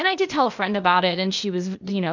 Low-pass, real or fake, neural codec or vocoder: 7.2 kHz; fake; codec, 16 kHz, 0.8 kbps, ZipCodec